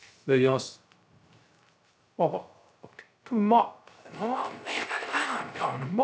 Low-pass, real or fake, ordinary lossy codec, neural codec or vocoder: none; fake; none; codec, 16 kHz, 0.3 kbps, FocalCodec